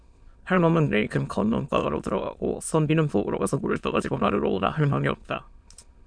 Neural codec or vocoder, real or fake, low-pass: autoencoder, 22.05 kHz, a latent of 192 numbers a frame, VITS, trained on many speakers; fake; 9.9 kHz